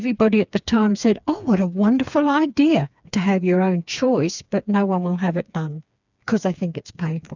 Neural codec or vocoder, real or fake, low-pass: codec, 16 kHz, 4 kbps, FreqCodec, smaller model; fake; 7.2 kHz